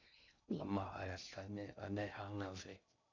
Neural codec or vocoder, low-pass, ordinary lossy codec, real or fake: codec, 16 kHz in and 24 kHz out, 0.6 kbps, FocalCodec, streaming, 2048 codes; 7.2 kHz; MP3, 64 kbps; fake